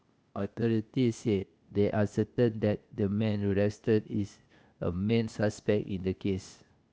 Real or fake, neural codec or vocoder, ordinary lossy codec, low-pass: fake; codec, 16 kHz, 0.8 kbps, ZipCodec; none; none